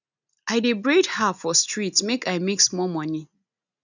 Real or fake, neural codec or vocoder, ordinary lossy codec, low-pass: real; none; none; 7.2 kHz